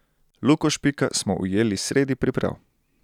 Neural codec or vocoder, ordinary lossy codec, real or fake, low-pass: none; none; real; 19.8 kHz